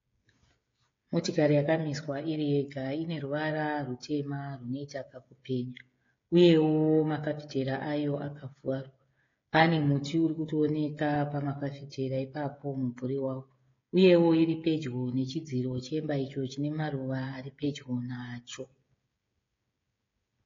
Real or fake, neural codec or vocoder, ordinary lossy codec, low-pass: fake; codec, 16 kHz, 16 kbps, FreqCodec, smaller model; AAC, 32 kbps; 7.2 kHz